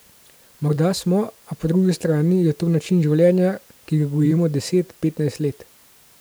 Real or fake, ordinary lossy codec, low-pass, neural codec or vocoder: fake; none; none; vocoder, 44.1 kHz, 128 mel bands every 512 samples, BigVGAN v2